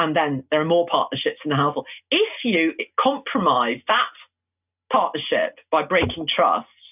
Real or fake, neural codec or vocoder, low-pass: real; none; 3.6 kHz